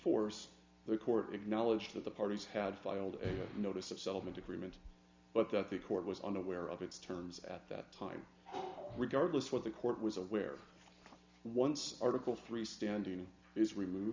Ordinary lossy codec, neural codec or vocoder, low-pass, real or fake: MP3, 64 kbps; none; 7.2 kHz; real